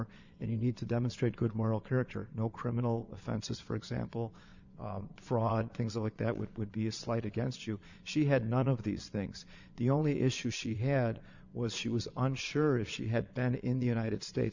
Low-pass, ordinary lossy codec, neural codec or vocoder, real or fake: 7.2 kHz; Opus, 64 kbps; vocoder, 22.05 kHz, 80 mel bands, Vocos; fake